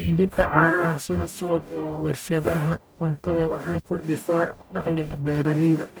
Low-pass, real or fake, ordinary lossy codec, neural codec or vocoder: none; fake; none; codec, 44.1 kHz, 0.9 kbps, DAC